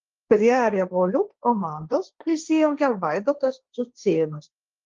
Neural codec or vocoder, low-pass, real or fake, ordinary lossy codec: codec, 16 kHz, 1.1 kbps, Voila-Tokenizer; 7.2 kHz; fake; Opus, 32 kbps